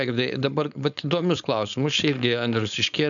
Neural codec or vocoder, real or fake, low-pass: codec, 16 kHz, 4.8 kbps, FACodec; fake; 7.2 kHz